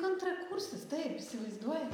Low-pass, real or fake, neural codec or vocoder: 19.8 kHz; real; none